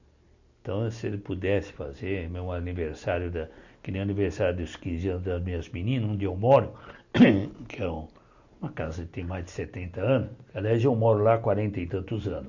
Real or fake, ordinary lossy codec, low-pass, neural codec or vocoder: real; none; 7.2 kHz; none